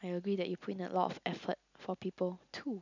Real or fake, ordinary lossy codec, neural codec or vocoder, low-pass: real; none; none; 7.2 kHz